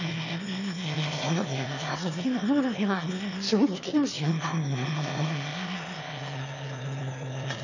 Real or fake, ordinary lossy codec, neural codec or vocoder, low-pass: fake; none; autoencoder, 22.05 kHz, a latent of 192 numbers a frame, VITS, trained on one speaker; 7.2 kHz